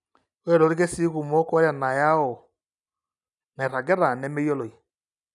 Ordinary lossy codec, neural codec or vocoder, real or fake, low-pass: none; none; real; 10.8 kHz